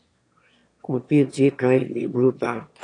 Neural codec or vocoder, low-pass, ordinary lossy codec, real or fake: autoencoder, 22.05 kHz, a latent of 192 numbers a frame, VITS, trained on one speaker; 9.9 kHz; AAC, 48 kbps; fake